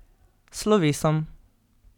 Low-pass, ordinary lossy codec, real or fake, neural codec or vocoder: 19.8 kHz; none; real; none